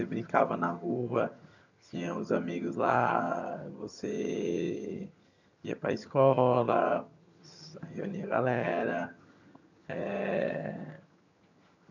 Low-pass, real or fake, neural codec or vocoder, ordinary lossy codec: 7.2 kHz; fake; vocoder, 22.05 kHz, 80 mel bands, HiFi-GAN; none